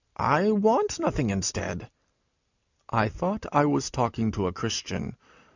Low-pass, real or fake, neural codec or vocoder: 7.2 kHz; real; none